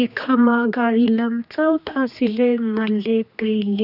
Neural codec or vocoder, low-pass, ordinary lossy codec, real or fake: codec, 16 kHz, 2 kbps, X-Codec, HuBERT features, trained on general audio; 5.4 kHz; none; fake